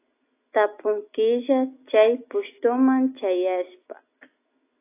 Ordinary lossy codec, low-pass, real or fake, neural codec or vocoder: AAC, 24 kbps; 3.6 kHz; real; none